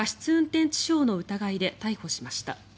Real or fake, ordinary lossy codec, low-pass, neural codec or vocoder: real; none; none; none